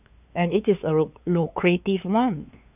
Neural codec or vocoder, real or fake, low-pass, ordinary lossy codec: codec, 16 kHz, 2 kbps, FunCodec, trained on LibriTTS, 25 frames a second; fake; 3.6 kHz; none